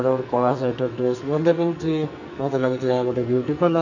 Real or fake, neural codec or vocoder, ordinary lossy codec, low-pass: fake; codec, 44.1 kHz, 2.6 kbps, SNAC; none; 7.2 kHz